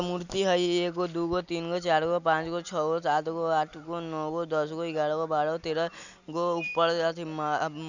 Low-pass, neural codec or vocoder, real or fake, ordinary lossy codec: 7.2 kHz; none; real; none